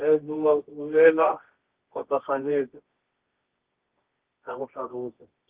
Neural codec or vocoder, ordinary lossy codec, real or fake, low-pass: codec, 24 kHz, 0.9 kbps, WavTokenizer, medium music audio release; Opus, 16 kbps; fake; 3.6 kHz